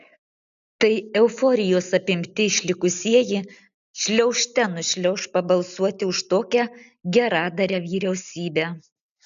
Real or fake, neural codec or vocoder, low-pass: real; none; 7.2 kHz